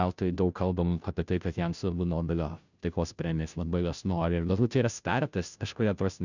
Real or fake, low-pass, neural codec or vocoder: fake; 7.2 kHz; codec, 16 kHz, 0.5 kbps, FunCodec, trained on Chinese and English, 25 frames a second